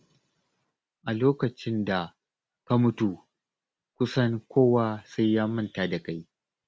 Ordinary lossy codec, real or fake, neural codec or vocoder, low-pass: none; real; none; none